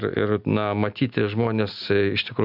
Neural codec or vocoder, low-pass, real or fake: none; 5.4 kHz; real